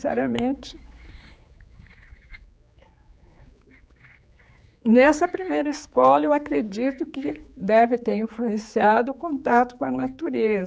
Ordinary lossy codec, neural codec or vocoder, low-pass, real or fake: none; codec, 16 kHz, 4 kbps, X-Codec, HuBERT features, trained on general audio; none; fake